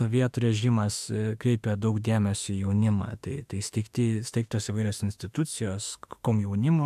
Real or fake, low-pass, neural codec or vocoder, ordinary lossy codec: fake; 14.4 kHz; autoencoder, 48 kHz, 32 numbers a frame, DAC-VAE, trained on Japanese speech; AAC, 96 kbps